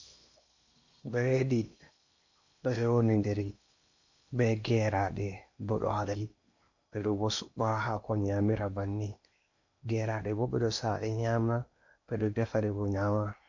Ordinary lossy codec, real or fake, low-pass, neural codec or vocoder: MP3, 48 kbps; fake; 7.2 kHz; codec, 16 kHz in and 24 kHz out, 0.8 kbps, FocalCodec, streaming, 65536 codes